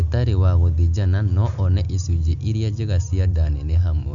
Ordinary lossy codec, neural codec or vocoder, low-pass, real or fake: none; none; 7.2 kHz; real